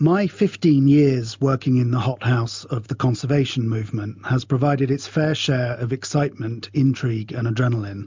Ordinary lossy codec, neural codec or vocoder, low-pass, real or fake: MP3, 64 kbps; none; 7.2 kHz; real